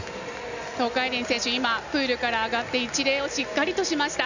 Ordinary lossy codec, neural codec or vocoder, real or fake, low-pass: none; none; real; 7.2 kHz